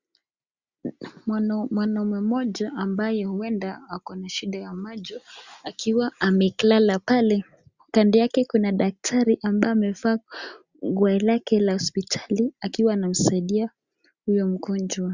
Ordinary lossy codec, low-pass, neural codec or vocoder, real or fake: Opus, 64 kbps; 7.2 kHz; none; real